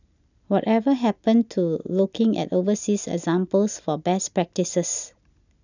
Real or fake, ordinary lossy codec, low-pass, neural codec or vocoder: real; none; 7.2 kHz; none